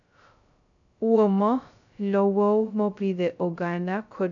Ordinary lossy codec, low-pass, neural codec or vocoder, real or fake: none; 7.2 kHz; codec, 16 kHz, 0.2 kbps, FocalCodec; fake